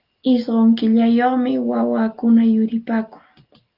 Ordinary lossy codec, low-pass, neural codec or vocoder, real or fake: Opus, 32 kbps; 5.4 kHz; none; real